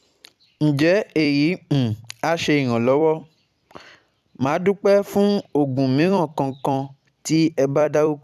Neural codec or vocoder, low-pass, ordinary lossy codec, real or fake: vocoder, 44.1 kHz, 128 mel bands every 256 samples, BigVGAN v2; 14.4 kHz; none; fake